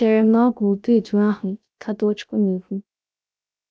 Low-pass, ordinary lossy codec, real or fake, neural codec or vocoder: none; none; fake; codec, 16 kHz, 0.3 kbps, FocalCodec